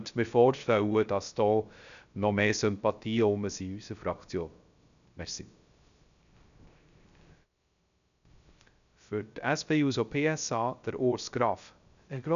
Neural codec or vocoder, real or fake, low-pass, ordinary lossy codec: codec, 16 kHz, 0.3 kbps, FocalCodec; fake; 7.2 kHz; MP3, 96 kbps